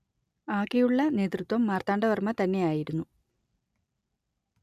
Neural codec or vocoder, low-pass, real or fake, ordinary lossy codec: none; 14.4 kHz; real; none